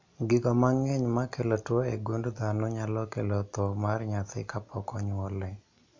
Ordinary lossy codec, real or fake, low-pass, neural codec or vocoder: MP3, 64 kbps; real; 7.2 kHz; none